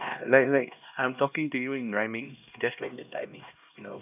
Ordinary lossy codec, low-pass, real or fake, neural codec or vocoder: none; 3.6 kHz; fake; codec, 16 kHz, 1 kbps, X-Codec, HuBERT features, trained on LibriSpeech